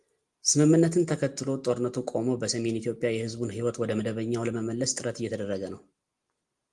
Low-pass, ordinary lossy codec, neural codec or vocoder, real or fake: 10.8 kHz; Opus, 24 kbps; none; real